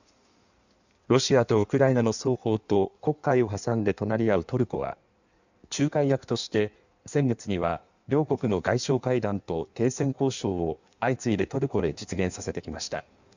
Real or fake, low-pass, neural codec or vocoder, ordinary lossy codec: fake; 7.2 kHz; codec, 16 kHz in and 24 kHz out, 1.1 kbps, FireRedTTS-2 codec; none